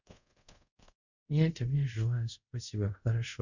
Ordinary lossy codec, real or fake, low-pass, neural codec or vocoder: none; fake; 7.2 kHz; codec, 24 kHz, 0.5 kbps, DualCodec